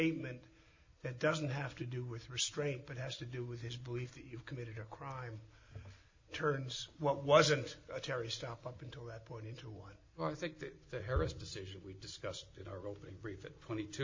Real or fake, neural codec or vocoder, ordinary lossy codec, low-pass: real; none; MP3, 32 kbps; 7.2 kHz